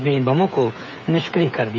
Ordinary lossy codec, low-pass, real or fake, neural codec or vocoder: none; none; fake; codec, 16 kHz, 8 kbps, FreqCodec, smaller model